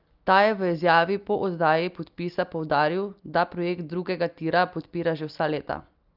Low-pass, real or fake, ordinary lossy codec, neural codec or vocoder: 5.4 kHz; real; Opus, 24 kbps; none